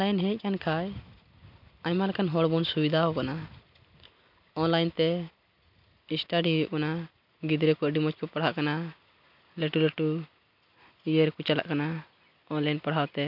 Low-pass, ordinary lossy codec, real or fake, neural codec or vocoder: 5.4 kHz; none; real; none